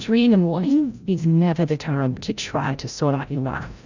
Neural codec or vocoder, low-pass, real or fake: codec, 16 kHz, 0.5 kbps, FreqCodec, larger model; 7.2 kHz; fake